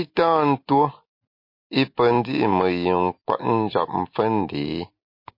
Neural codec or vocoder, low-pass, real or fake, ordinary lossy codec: none; 5.4 kHz; real; MP3, 32 kbps